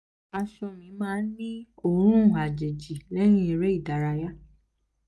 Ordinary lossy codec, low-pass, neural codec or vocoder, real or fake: none; none; none; real